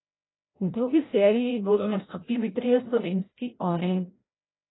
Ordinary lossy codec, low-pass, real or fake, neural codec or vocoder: AAC, 16 kbps; 7.2 kHz; fake; codec, 16 kHz, 0.5 kbps, FreqCodec, larger model